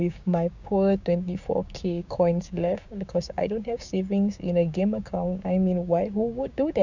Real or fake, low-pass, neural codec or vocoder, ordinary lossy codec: fake; 7.2 kHz; codec, 16 kHz, 6 kbps, DAC; none